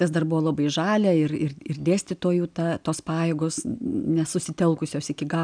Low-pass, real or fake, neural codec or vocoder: 9.9 kHz; real; none